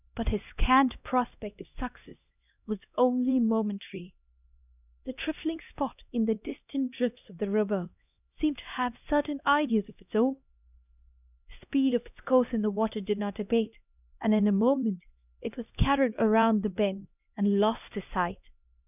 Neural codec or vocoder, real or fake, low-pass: codec, 16 kHz, 1 kbps, X-Codec, HuBERT features, trained on LibriSpeech; fake; 3.6 kHz